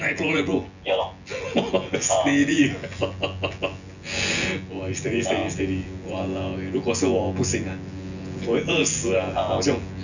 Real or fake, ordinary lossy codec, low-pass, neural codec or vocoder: fake; none; 7.2 kHz; vocoder, 24 kHz, 100 mel bands, Vocos